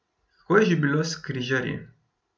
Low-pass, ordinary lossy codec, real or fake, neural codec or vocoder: none; none; real; none